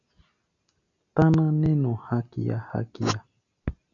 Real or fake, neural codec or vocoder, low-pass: real; none; 7.2 kHz